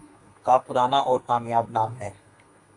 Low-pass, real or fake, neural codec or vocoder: 10.8 kHz; fake; codec, 32 kHz, 1.9 kbps, SNAC